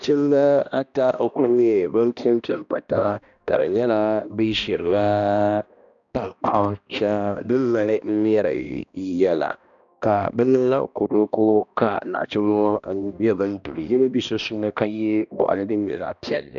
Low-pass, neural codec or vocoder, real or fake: 7.2 kHz; codec, 16 kHz, 1 kbps, X-Codec, HuBERT features, trained on balanced general audio; fake